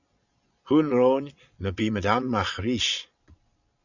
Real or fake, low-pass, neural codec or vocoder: fake; 7.2 kHz; vocoder, 22.05 kHz, 80 mel bands, Vocos